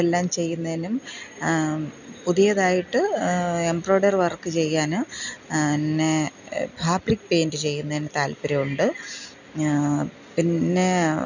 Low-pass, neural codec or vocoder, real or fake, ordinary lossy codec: 7.2 kHz; none; real; none